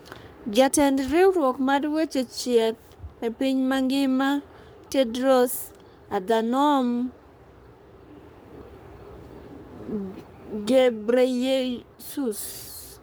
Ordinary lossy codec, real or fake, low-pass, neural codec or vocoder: none; fake; none; codec, 44.1 kHz, 3.4 kbps, Pupu-Codec